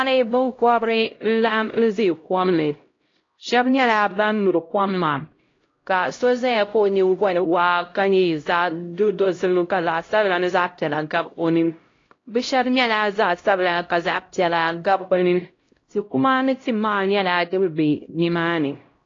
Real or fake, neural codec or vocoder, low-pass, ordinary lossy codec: fake; codec, 16 kHz, 0.5 kbps, X-Codec, HuBERT features, trained on LibriSpeech; 7.2 kHz; AAC, 32 kbps